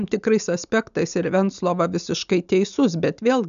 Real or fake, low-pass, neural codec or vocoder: real; 7.2 kHz; none